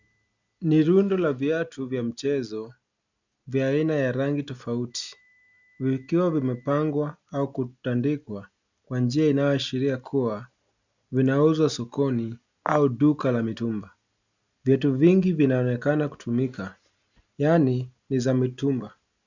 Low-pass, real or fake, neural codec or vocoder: 7.2 kHz; real; none